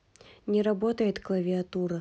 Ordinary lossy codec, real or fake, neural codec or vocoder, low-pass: none; real; none; none